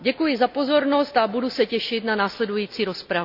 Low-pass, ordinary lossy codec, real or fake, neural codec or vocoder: 5.4 kHz; none; real; none